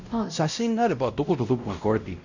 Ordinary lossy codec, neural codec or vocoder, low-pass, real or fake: none; codec, 16 kHz, 0.5 kbps, X-Codec, WavLM features, trained on Multilingual LibriSpeech; 7.2 kHz; fake